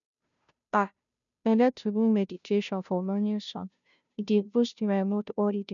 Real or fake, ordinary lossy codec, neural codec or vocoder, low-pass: fake; none; codec, 16 kHz, 0.5 kbps, FunCodec, trained on Chinese and English, 25 frames a second; 7.2 kHz